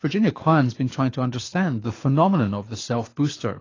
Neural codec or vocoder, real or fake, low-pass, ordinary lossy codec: codec, 24 kHz, 6 kbps, HILCodec; fake; 7.2 kHz; AAC, 32 kbps